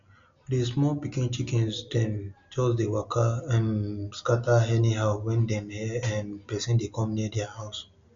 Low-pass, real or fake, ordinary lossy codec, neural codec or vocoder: 7.2 kHz; real; MP3, 64 kbps; none